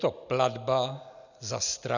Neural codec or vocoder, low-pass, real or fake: none; 7.2 kHz; real